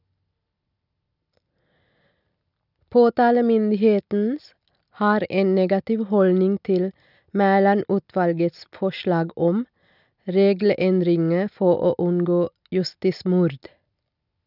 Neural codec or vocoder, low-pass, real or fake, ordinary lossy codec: none; 5.4 kHz; real; none